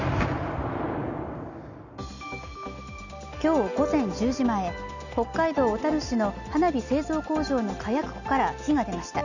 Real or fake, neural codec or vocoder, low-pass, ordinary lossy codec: real; none; 7.2 kHz; none